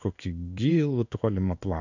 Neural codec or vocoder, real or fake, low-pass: codec, 16 kHz in and 24 kHz out, 1 kbps, XY-Tokenizer; fake; 7.2 kHz